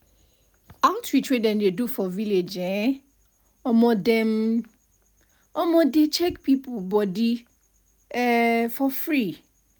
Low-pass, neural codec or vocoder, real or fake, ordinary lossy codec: none; none; real; none